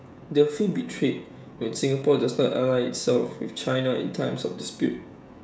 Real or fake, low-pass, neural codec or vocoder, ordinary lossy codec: fake; none; codec, 16 kHz, 16 kbps, FreqCodec, smaller model; none